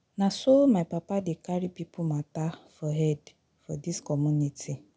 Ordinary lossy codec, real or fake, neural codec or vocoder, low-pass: none; real; none; none